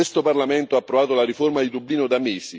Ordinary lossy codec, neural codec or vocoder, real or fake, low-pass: none; none; real; none